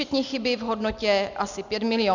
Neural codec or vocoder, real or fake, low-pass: none; real; 7.2 kHz